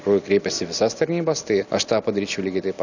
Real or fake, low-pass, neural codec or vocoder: real; 7.2 kHz; none